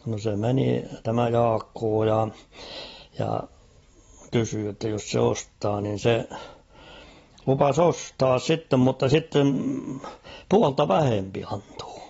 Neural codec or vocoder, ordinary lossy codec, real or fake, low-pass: none; AAC, 24 kbps; real; 19.8 kHz